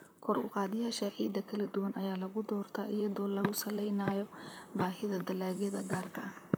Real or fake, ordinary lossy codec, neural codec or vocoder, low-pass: fake; none; vocoder, 44.1 kHz, 128 mel bands, Pupu-Vocoder; none